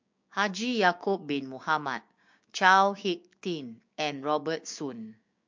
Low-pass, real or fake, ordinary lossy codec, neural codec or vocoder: 7.2 kHz; fake; MP3, 48 kbps; codec, 16 kHz, 6 kbps, DAC